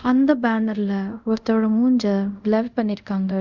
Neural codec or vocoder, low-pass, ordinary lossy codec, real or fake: codec, 24 kHz, 0.5 kbps, DualCodec; 7.2 kHz; Opus, 64 kbps; fake